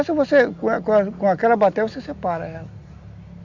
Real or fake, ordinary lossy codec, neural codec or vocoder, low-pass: real; Opus, 64 kbps; none; 7.2 kHz